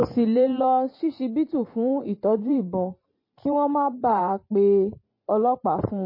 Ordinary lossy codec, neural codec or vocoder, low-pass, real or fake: MP3, 24 kbps; vocoder, 44.1 kHz, 128 mel bands every 512 samples, BigVGAN v2; 5.4 kHz; fake